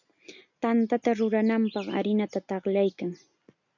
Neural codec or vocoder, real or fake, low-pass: none; real; 7.2 kHz